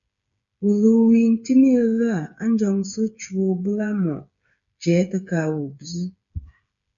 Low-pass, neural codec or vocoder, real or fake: 7.2 kHz; codec, 16 kHz, 8 kbps, FreqCodec, smaller model; fake